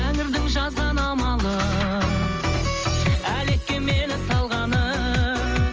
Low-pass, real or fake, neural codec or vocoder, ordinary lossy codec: 7.2 kHz; real; none; Opus, 24 kbps